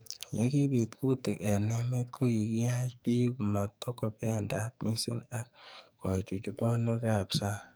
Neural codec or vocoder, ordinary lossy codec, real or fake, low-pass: codec, 44.1 kHz, 2.6 kbps, SNAC; none; fake; none